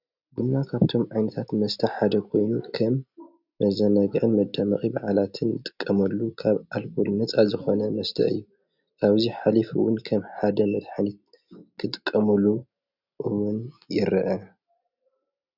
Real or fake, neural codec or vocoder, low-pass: real; none; 5.4 kHz